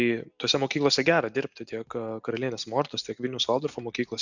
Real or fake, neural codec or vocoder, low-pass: real; none; 7.2 kHz